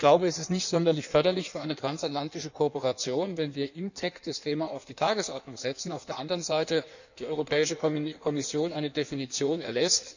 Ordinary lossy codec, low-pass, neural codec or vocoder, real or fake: none; 7.2 kHz; codec, 16 kHz in and 24 kHz out, 1.1 kbps, FireRedTTS-2 codec; fake